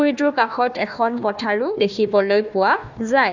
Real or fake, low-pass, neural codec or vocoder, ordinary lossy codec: fake; 7.2 kHz; codec, 16 kHz, 1 kbps, FunCodec, trained on Chinese and English, 50 frames a second; none